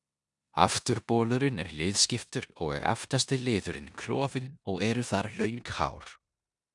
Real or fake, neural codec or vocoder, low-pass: fake; codec, 16 kHz in and 24 kHz out, 0.9 kbps, LongCat-Audio-Codec, four codebook decoder; 10.8 kHz